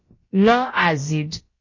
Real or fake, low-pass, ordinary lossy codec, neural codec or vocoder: fake; 7.2 kHz; MP3, 32 kbps; codec, 16 kHz, about 1 kbps, DyCAST, with the encoder's durations